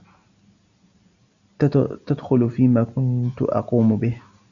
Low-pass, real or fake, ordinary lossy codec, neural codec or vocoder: 7.2 kHz; real; MP3, 96 kbps; none